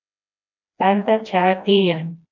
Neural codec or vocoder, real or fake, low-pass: codec, 16 kHz, 1 kbps, FreqCodec, smaller model; fake; 7.2 kHz